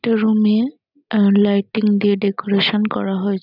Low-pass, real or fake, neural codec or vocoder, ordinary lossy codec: 5.4 kHz; real; none; none